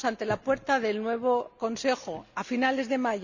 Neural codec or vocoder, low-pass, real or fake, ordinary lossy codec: none; 7.2 kHz; real; none